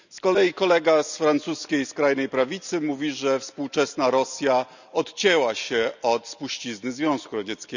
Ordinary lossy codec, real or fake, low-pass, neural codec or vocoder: none; real; 7.2 kHz; none